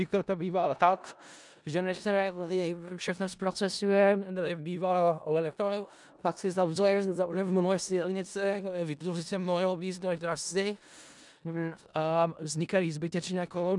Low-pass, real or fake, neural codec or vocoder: 10.8 kHz; fake; codec, 16 kHz in and 24 kHz out, 0.4 kbps, LongCat-Audio-Codec, four codebook decoder